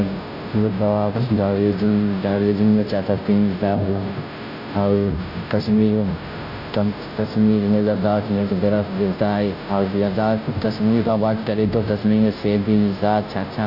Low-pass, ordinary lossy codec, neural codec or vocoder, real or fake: 5.4 kHz; AAC, 48 kbps; codec, 16 kHz, 0.5 kbps, FunCodec, trained on Chinese and English, 25 frames a second; fake